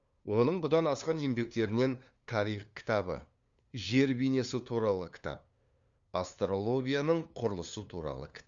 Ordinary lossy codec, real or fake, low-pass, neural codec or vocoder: Opus, 64 kbps; fake; 7.2 kHz; codec, 16 kHz, 2 kbps, FunCodec, trained on LibriTTS, 25 frames a second